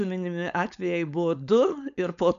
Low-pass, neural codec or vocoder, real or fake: 7.2 kHz; codec, 16 kHz, 4.8 kbps, FACodec; fake